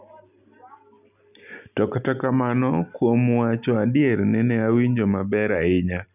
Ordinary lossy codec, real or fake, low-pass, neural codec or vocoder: none; real; 3.6 kHz; none